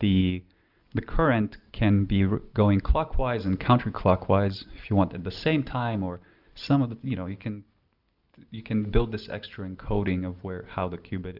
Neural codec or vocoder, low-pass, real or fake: none; 5.4 kHz; real